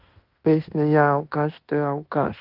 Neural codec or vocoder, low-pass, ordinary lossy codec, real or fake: codec, 16 kHz in and 24 kHz out, 0.9 kbps, LongCat-Audio-Codec, fine tuned four codebook decoder; 5.4 kHz; Opus, 32 kbps; fake